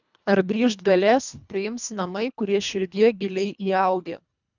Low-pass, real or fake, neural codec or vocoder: 7.2 kHz; fake; codec, 24 kHz, 1.5 kbps, HILCodec